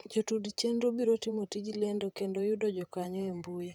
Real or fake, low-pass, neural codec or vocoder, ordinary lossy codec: fake; 19.8 kHz; vocoder, 44.1 kHz, 128 mel bands, Pupu-Vocoder; none